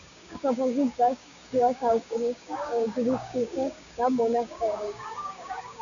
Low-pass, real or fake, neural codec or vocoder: 7.2 kHz; fake; codec, 16 kHz, 6 kbps, DAC